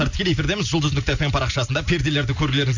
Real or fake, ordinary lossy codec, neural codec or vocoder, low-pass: real; none; none; 7.2 kHz